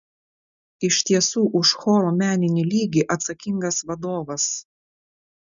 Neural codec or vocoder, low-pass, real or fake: none; 7.2 kHz; real